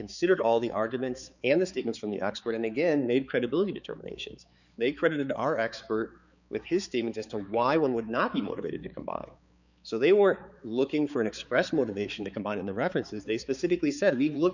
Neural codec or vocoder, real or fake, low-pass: codec, 16 kHz, 4 kbps, X-Codec, HuBERT features, trained on balanced general audio; fake; 7.2 kHz